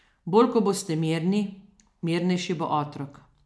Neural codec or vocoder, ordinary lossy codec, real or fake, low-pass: none; none; real; none